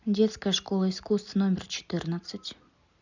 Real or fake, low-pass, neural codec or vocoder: real; 7.2 kHz; none